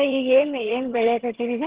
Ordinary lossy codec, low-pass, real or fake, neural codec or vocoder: Opus, 16 kbps; 3.6 kHz; fake; vocoder, 22.05 kHz, 80 mel bands, HiFi-GAN